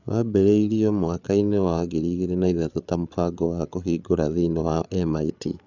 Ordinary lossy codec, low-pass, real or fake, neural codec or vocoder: none; 7.2 kHz; fake; codec, 16 kHz, 8 kbps, FreqCodec, larger model